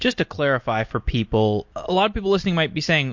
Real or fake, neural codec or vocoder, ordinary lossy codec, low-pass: real; none; MP3, 48 kbps; 7.2 kHz